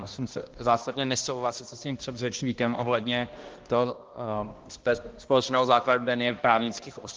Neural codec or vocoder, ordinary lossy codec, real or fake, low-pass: codec, 16 kHz, 1 kbps, X-Codec, HuBERT features, trained on balanced general audio; Opus, 16 kbps; fake; 7.2 kHz